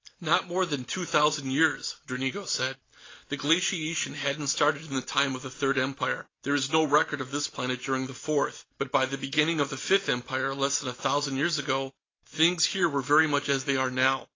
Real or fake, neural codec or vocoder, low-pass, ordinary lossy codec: real; none; 7.2 kHz; AAC, 32 kbps